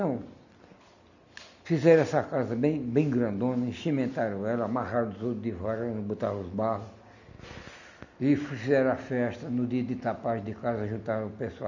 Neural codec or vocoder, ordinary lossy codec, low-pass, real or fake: none; none; 7.2 kHz; real